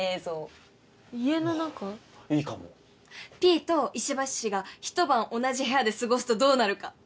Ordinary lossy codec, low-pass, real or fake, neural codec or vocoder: none; none; real; none